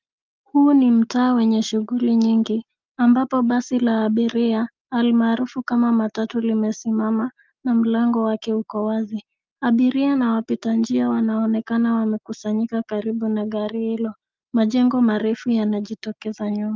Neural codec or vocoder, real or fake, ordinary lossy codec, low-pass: none; real; Opus, 24 kbps; 7.2 kHz